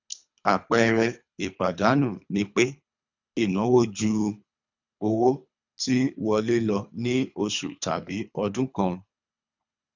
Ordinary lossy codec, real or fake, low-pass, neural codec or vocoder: none; fake; 7.2 kHz; codec, 24 kHz, 3 kbps, HILCodec